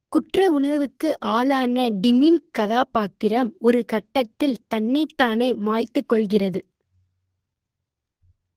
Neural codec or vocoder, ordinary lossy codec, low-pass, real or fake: codec, 32 kHz, 1.9 kbps, SNAC; Opus, 24 kbps; 14.4 kHz; fake